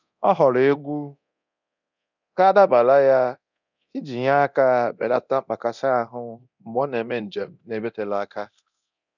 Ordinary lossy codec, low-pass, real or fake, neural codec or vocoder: none; 7.2 kHz; fake; codec, 24 kHz, 0.9 kbps, DualCodec